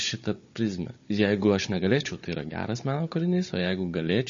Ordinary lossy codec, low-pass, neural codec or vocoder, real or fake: MP3, 32 kbps; 7.2 kHz; codec, 16 kHz, 16 kbps, FunCodec, trained on Chinese and English, 50 frames a second; fake